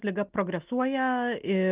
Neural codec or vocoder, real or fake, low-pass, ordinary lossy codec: none; real; 3.6 kHz; Opus, 32 kbps